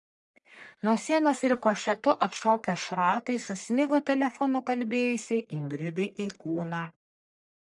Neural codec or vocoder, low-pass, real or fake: codec, 44.1 kHz, 1.7 kbps, Pupu-Codec; 10.8 kHz; fake